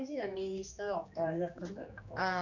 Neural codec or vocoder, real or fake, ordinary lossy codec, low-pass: codec, 16 kHz, 2 kbps, X-Codec, HuBERT features, trained on general audio; fake; none; 7.2 kHz